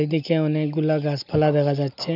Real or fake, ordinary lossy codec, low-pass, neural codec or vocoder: real; none; 5.4 kHz; none